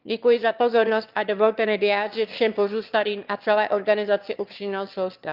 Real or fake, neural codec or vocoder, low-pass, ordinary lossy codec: fake; autoencoder, 22.05 kHz, a latent of 192 numbers a frame, VITS, trained on one speaker; 5.4 kHz; Opus, 24 kbps